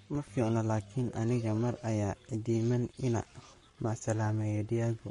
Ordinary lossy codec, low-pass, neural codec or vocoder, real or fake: MP3, 48 kbps; 19.8 kHz; codec, 44.1 kHz, 7.8 kbps, Pupu-Codec; fake